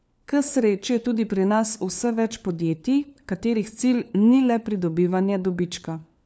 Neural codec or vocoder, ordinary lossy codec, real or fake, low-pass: codec, 16 kHz, 4 kbps, FunCodec, trained on LibriTTS, 50 frames a second; none; fake; none